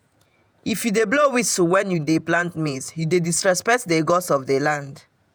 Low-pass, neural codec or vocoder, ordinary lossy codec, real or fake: none; vocoder, 48 kHz, 128 mel bands, Vocos; none; fake